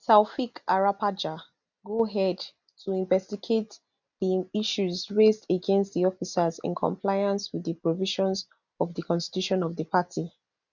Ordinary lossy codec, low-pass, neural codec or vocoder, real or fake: none; 7.2 kHz; none; real